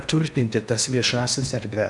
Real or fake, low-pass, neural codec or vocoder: fake; 10.8 kHz; codec, 16 kHz in and 24 kHz out, 0.8 kbps, FocalCodec, streaming, 65536 codes